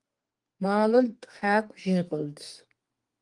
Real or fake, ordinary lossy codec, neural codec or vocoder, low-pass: fake; Opus, 24 kbps; codec, 32 kHz, 1.9 kbps, SNAC; 10.8 kHz